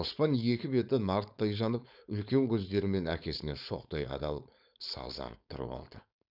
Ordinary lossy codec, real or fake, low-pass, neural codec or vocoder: none; fake; 5.4 kHz; codec, 16 kHz, 4.8 kbps, FACodec